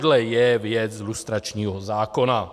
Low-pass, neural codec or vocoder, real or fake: 14.4 kHz; none; real